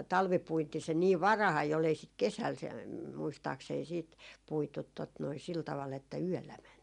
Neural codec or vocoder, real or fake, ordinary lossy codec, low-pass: none; real; none; 10.8 kHz